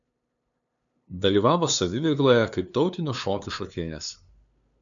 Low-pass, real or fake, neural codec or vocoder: 7.2 kHz; fake; codec, 16 kHz, 2 kbps, FunCodec, trained on LibriTTS, 25 frames a second